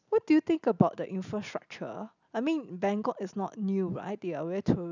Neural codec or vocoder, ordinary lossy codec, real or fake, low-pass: none; none; real; 7.2 kHz